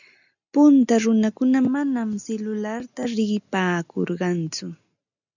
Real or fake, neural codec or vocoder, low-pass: real; none; 7.2 kHz